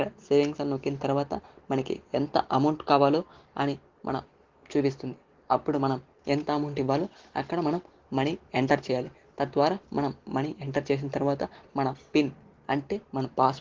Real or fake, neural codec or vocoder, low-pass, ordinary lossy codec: real; none; 7.2 kHz; Opus, 16 kbps